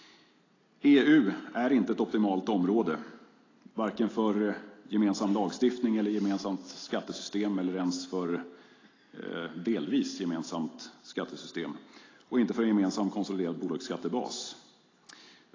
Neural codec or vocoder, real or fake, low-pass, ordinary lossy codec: none; real; 7.2 kHz; AAC, 32 kbps